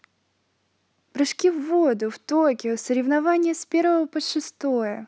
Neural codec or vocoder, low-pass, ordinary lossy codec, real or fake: none; none; none; real